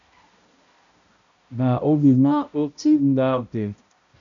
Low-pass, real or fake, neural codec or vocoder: 7.2 kHz; fake; codec, 16 kHz, 0.5 kbps, X-Codec, HuBERT features, trained on balanced general audio